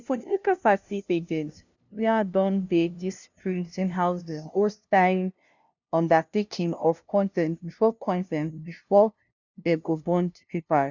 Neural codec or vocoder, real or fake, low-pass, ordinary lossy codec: codec, 16 kHz, 0.5 kbps, FunCodec, trained on LibriTTS, 25 frames a second; fake; 7.2 kHz; none